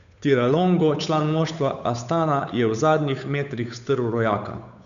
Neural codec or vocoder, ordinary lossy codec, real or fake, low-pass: codec, 16 kHz, 8 kbps, FunCodec, trained on Chinese and English, 25 frames a second; none; fake; 7.2 kHz